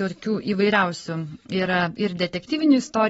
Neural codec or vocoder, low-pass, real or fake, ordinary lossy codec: none; 19.8 kHz; real; AAC, 24 kbps